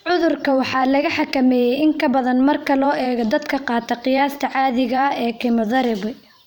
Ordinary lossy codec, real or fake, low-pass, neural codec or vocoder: none; fake; 19.8 kHz; vocoder, 44.1 kHz, 128 mel bands every 512 samples, BigVGAN v2